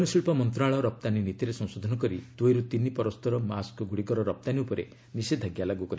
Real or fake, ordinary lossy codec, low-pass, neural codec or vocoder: real; none; none; none